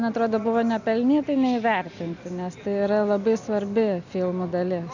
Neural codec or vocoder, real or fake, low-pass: none; real; 7.2 kHz